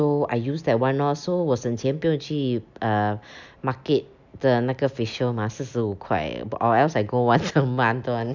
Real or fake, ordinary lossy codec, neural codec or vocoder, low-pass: real; none; none; 7.2 kHz